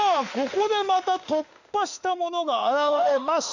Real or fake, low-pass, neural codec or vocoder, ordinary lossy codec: fake; 7.2 kHz; autoencoder, 48 kHz, 32 numbers a frame, DAC-VAE, trained on Japanese speech; none